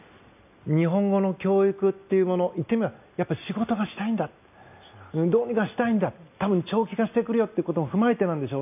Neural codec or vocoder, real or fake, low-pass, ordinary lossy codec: none; real; 3.6 kHz; none